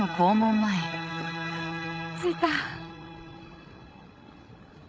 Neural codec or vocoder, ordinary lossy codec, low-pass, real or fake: codec, 16 kHz, 16 kbps, FreqCodec, larger model; none; none; fake